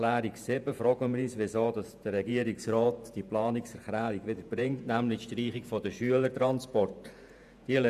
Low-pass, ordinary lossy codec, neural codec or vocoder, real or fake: 14.4 kHz; none; vocoder, 48 kHz, 128 mel bands, Vocos; fake